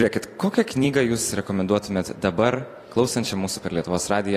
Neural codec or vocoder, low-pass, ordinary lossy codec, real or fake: vocoder, 44.1 kHz, 128 mel bands every 256 samples, BigVGAN v2; 14.4 kHz; AAC, 48 kbps; fake